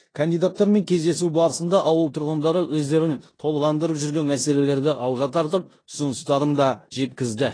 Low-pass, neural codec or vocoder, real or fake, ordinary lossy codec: 9.9 kHz; codec, 16 kHz in and 24 kHz out, 0.9 kbps, LongCat-Audio-Codec, four codebook decoder; fake; AAC, 32 kbps